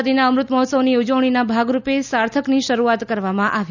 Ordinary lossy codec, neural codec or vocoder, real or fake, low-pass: none; none; real; 7.2 kHz